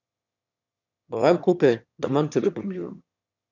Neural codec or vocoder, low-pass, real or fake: autoencoder, 22.05 kHz, a latent of 192 numbers a frame, VITS, trained on one speaker; 7.2 kHz; fake